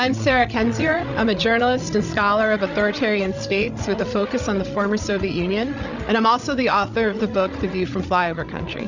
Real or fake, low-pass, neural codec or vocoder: fake; 7.2 kHz; codec, 16 kHz, 16 kbps, FreqCodec, larger model